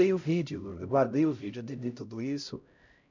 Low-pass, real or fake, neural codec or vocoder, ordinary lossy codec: 7.2 kHz; fake; codec, 16 kHz, 0.5 kbps, X-Codec, HuBERT features, trained on LibriSpeech; none